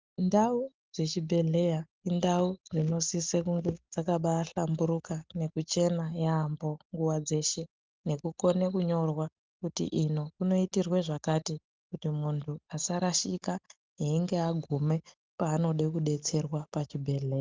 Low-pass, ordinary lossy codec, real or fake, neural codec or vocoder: 7.2 kHz; Opus, 32 kbps; real; none